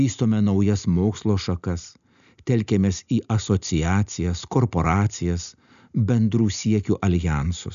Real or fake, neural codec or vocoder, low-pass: real; none; 7.2 kHz